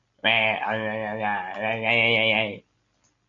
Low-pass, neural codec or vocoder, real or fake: 7.2 kHz; none; real